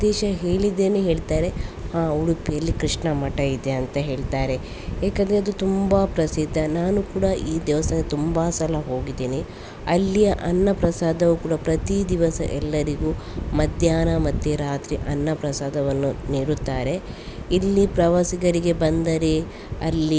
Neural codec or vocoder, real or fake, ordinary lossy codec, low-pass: none; real; none; none